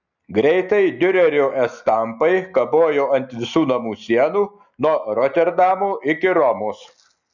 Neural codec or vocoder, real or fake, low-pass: none; real; 7.2 kHz